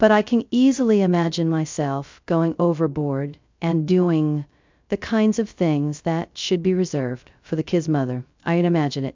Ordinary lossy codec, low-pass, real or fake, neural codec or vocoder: MP3, 64 kbps; 7.2 kHz; fake; codec, 16 kHz, 0.2 kbps, FocalCodec